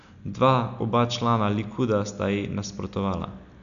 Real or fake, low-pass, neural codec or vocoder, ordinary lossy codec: real; 7.2 kHz; none; none